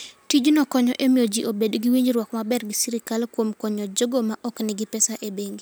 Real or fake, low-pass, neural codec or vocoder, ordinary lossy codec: real; none; none; none